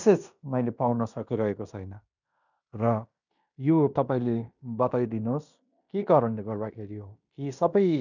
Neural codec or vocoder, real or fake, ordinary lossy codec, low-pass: codec, 16 kHz in and 24 kHz out, 0.9 kbps, LongCat-Audio-Codec, fine tuned four codebook decoder; fake; none; 7.2 kHz